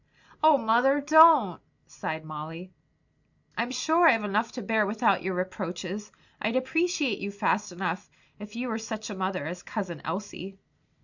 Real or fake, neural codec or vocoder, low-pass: real; none; 7.2 kHz